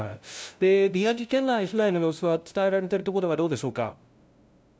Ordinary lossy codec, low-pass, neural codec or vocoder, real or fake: none; none; codec, 16 kHz, 0.5 kbps, FunCodec, trained on LibriTTS, 25 frames a second; fake